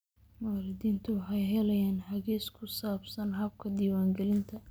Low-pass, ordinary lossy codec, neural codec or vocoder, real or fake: none; none; none; real